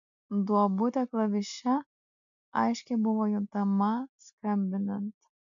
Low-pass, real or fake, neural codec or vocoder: 7.2 kHz; real; none